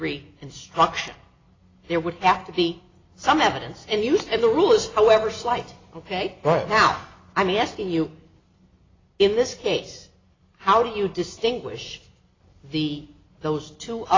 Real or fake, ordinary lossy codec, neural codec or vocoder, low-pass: real; AAC, 32 kbps; none; 7.2 kHz